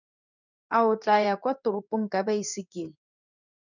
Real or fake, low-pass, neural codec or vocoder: fake; 7.2 kHz; codec, 16 kHz in and 24 kHz out, 1 kbps, XY-Tokenizer